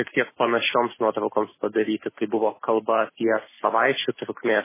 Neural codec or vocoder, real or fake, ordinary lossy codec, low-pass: none; real; MP3, 16 kbps; 3.6 kHz